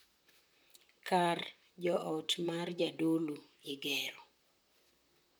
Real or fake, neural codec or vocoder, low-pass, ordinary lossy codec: fake; vocoder, 44.1 kHz, 128 mel bands, Pupu-Vocoder; none; none